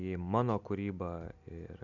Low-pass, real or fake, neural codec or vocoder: 7.2 kHz; real; none